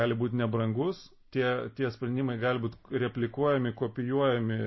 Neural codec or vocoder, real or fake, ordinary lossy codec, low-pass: none; real; MP3, 24 kbps; 7.2 kHz